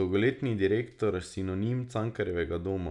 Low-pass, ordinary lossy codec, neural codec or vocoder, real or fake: 10.8 kHz; none; none; real